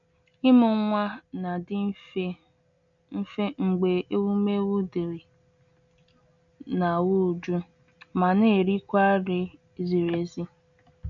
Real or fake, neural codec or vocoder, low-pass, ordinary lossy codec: real; none; 7.2 kHz; none